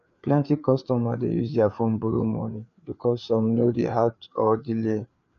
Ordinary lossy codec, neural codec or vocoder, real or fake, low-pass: none; codec, 16 kHz, 4 kbps, FreqCodec, larger model; fake; 7.2 kHz